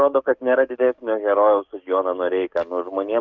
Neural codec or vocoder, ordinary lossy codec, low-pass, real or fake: codec, 44.1 kHz, 7.8 kbps, DAC; Opus, 24 kbps; 7.2 kHz; fake